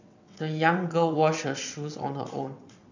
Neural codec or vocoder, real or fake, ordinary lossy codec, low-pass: vocoder, 22.05 kHz, 80 mel bands, WaveNeXt; fake; none; 7.2 kHz